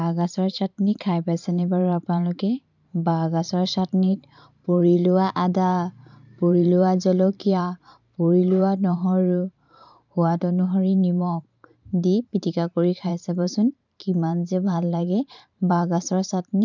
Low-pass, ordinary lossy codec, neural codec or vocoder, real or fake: 7.2 kHz; none; vocoder, 44.1 kHz, 128 mel bands every 512 samples, BigVGAN v2; fake